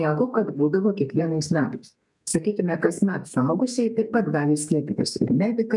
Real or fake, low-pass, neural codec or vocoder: fake; 10.8 kHz; codec, 32 kHz, 1.9 kbps, SNAC